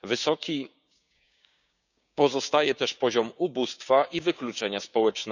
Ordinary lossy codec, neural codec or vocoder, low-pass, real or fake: none; codec, 16 kHz, 6 kbps, DAC; 7.2 kHz; fake